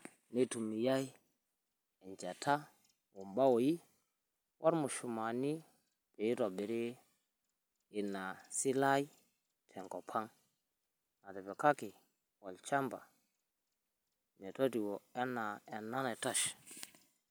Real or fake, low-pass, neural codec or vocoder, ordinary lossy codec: real; none; none; none